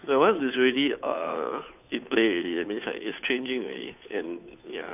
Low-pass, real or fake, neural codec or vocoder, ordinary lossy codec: 3.6 kHz; fake; codec, 16 kHz, 2 kbps, FunCodec, trained on Chinese and English, 25 frames a second; none